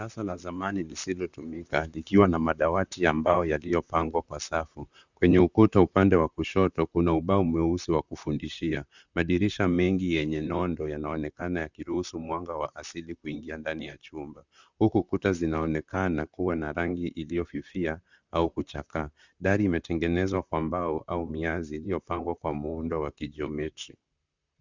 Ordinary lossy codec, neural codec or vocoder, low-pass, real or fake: Opus, 64 kbps; vocoder, 44.1 kHz, 128 mel bands, Pupu-Vocoder; 7.2 kHz; fake